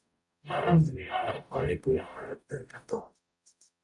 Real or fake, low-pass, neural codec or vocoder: fake; 10.8 kHz; codec, 44.1 kHz, 0.9 kbps, DAC